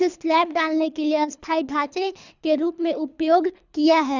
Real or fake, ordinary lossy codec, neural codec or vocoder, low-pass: fake; none; codec, 24 kHz, 3 kbps, HILCodec; 7.2 kHz